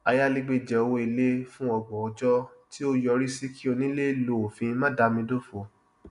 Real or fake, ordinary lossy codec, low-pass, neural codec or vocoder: real; none; 10.8 kHz; none